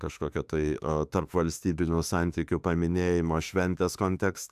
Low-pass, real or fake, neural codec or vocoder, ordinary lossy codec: 14.4 kHz; fake; autoencoder, 48 kHz, 32 numbers a frame, DAC-VAE, trained on Japanese speech; AAC, 96 kbps